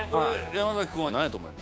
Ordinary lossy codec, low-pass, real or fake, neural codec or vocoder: none; none; fake; codec, 16 kHz, 6 kbps, DAC